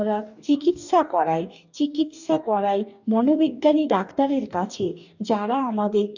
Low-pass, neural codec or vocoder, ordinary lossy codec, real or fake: 7.2 kHz; codec, 44.1 kHz, 2.6 kbps, DAC; none; fake